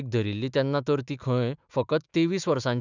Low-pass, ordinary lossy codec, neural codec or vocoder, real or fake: 7.2 kHz; none; autoencoder, 48 kHz, 128 numbers a frame, DAC-VAE, trained on Japanese speech; fake